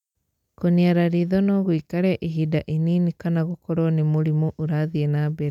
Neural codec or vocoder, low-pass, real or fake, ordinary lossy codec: none; 19.8 kHz; real; none